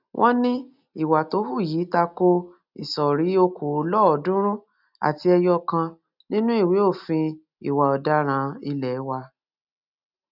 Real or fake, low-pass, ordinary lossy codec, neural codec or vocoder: real; 5.4 kHz; none; none